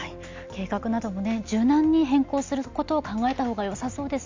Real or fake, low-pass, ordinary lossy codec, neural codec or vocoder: real; 7.2 kHz; MP3, 64 kbps; none